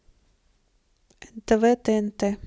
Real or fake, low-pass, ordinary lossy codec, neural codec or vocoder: real; none; none; none